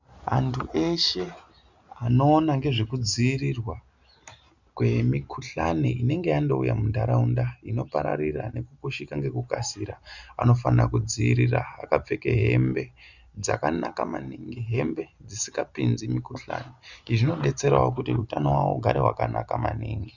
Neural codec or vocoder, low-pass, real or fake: none; 7.2 kHz; real